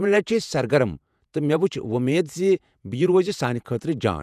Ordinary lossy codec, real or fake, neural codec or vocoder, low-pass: none; fake; vocoder, 48 kHz, 128 mel bands, Vocos; 14.4 kHz